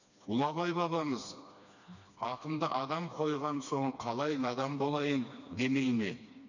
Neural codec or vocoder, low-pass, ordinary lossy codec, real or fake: codec, 16 kHz, 2 kbps, FreqCodec, smaller model; 7.2 kHz; none; fake